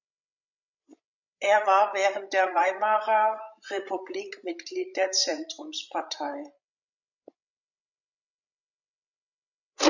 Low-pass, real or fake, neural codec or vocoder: 7.2 kHz; fake; codec, 16 kHz, 16 kbps, FreqCodec, larger model